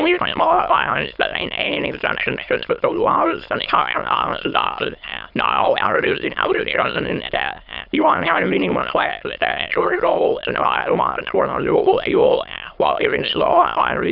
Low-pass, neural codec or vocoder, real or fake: 5.4 kHz; autoencoder, 22.05 kHz, a latent of 192 numbers a frame, VITS, trained on many speakers; fake